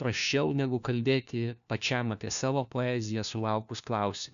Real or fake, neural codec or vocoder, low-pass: fake; codec, 16 kHz, 1 kbps, FunCodec, trained on LibriTTS, 50 frames a second; 7.2 kHz